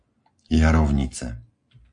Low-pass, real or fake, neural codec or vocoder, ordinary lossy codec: 9.9 kHz; real; none; AAC, 64 kbps